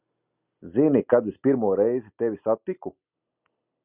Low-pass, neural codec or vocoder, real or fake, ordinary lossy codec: 3.6 kHz; none; real; Opus, 64 kbps